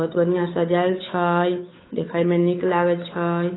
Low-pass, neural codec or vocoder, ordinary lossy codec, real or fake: 7.2 kHz; codec, 16 kHz, 8 kbps, FunCodec, trained on Chinese and English, 25 frames a second; AAC, 16 kbps; fake